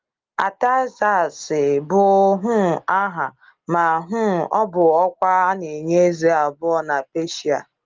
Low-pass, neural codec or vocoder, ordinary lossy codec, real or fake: 7.2 kHz; none; Opus, 32 kbps; real